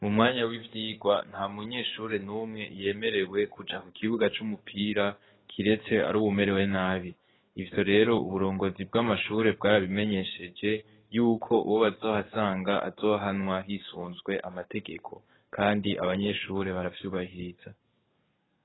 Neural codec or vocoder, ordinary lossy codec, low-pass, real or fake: codec, 44.1 kHz, 7.8 kbps, DAC; AAC, 16 kbps; 7.2 kHz; fake